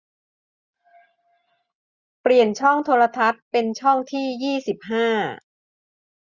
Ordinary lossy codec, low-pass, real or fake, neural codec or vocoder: Opus, 64 kbps; 7.2 kHz; real; none